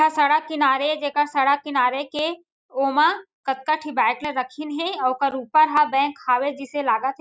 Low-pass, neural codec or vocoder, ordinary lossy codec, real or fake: none; none; none; real